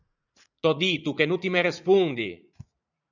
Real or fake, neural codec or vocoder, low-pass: fake; vocoder, 44.1 kHz, 80 mel bands, Vocos; 7.2 kHz